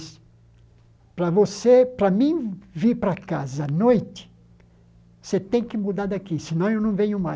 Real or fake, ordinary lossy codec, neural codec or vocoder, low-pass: real; none; none; none